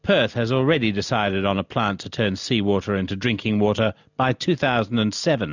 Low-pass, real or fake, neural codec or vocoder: 7.2 kHz; real; none